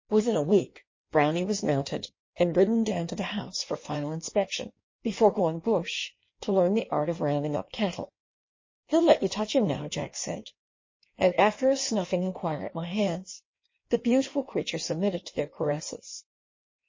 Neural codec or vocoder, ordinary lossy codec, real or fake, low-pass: codec, 16 kHz in and 24 kHz out, 1.1 kbps, FireRedTTS-2 codec; MP3, 32 kbps; fake; 7.2 kHz